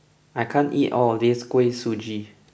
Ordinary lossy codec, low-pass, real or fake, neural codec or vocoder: none; none; real; none